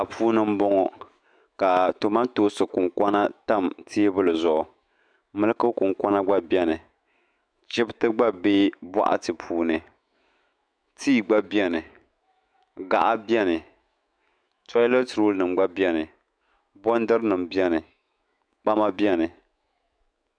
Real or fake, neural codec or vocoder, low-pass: fake; autoencoder, 48 kHz, 128 numbers a frame, DAC-VAE, trained on Japanese speech; 9.9 kHz